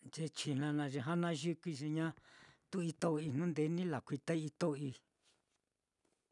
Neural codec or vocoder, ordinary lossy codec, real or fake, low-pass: none; none; real; 10.8 kHz